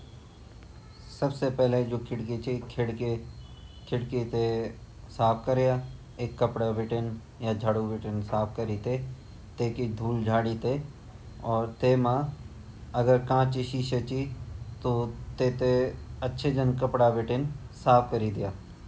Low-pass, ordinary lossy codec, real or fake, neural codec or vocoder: none; none; real; none